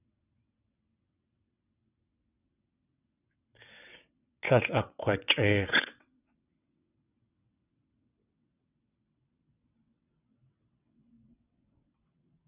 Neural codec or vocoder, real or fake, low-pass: vocoder, 22.05 kHz, 80 mel bands, WaveNeXt; fake; 3.6 kHz